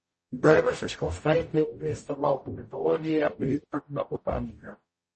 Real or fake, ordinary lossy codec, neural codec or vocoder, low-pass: fake; MP3, 32 kbps; codec, 44.1 kHz, 0.9 kbps, DAC; 10.8 kHz